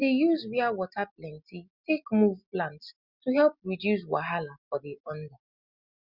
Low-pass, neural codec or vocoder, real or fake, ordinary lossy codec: 5.4 kHz; none; real; Opus, 64 kbps